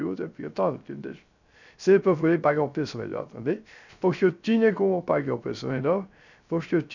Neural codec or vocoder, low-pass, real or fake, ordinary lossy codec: codec, 16 kHz, 0.3 kbps, FocalCodec; 7.2 kHz; fake; none